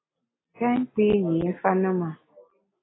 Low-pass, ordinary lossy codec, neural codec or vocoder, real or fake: 7.2 kHz; AAC, 16 kbps; none; real